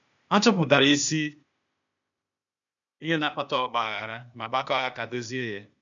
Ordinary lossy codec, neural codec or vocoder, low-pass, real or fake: none; codec, 16 kHz, 0.8 kbps, ZipCodec; 7.2 kHz; fake